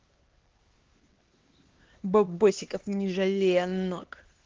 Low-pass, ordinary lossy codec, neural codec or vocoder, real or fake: 7.2 kHz; Opus, 16 kbps; codec, 16 kHz, 2 kbps, X-Codec, HuBERT features, trained on LibriSpeech; fake